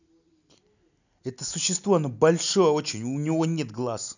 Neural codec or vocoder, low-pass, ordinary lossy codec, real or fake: none; 7.2 kHz; none; real